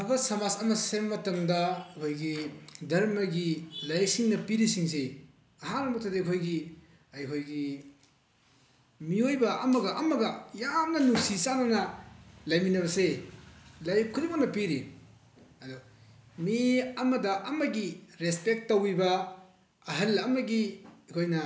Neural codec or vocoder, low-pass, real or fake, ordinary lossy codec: none; none; real; none